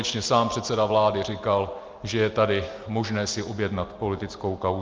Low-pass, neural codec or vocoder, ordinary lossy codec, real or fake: 7.2 kHz; none; Opus, 32 kbps; real